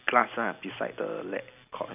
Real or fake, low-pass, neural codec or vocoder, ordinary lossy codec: real; 3.6 kHz; none; none